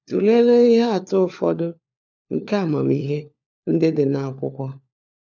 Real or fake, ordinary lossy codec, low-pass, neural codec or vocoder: fake; none; 7.2 kHz; codec, 16 kHz, 4 kbps, FunCodec, trained on LibriTTS, 50 frames a second